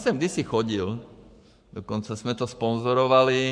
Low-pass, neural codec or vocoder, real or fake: 9.9 kHz; codec, 44.1 kHz, 7.8 kbps, Pupu-Codec; fake